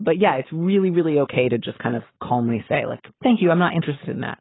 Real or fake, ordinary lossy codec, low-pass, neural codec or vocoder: fake; AAC, 16 kbps; 7.2 kHz; codec, 44.1 kHz, 7.8 kbps, Pupu-Codec